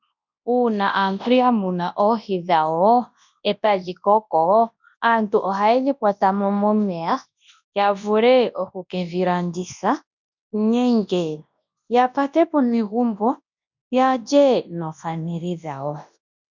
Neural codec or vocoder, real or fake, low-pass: codec, 24 kHz, 0.9 kbps, WavTokenizer, large speech release; fake; 7.2 kHz